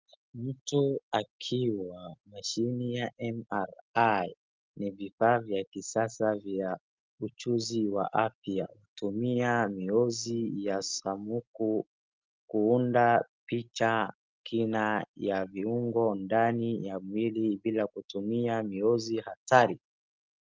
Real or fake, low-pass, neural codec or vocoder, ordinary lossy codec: real; 7.2 kHz; none; Opus, 24 kbps